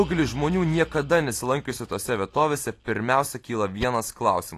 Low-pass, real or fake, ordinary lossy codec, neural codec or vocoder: 14.4 kHz; real; AAC, 48 kbps; none